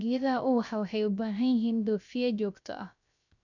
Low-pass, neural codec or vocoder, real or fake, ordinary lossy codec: 7.2 kHz; codec, 16 kHz, 0.3 kbps, FocalCodec; fake; none